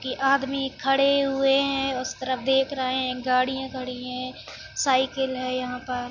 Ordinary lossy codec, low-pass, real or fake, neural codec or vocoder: none; 7.2 kHz; real; none